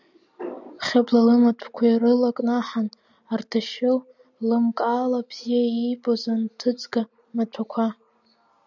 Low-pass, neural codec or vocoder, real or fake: 7.2 kHz; none; real